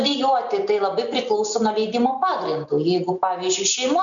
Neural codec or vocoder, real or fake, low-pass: none; real; 7.2 kHz